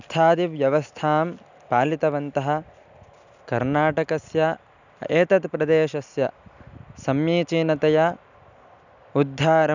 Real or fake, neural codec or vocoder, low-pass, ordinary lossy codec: real; none; 7.2 kHz; none